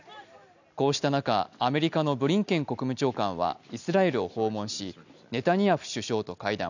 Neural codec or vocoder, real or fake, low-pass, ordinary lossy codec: none; real; 7.2 kHz; none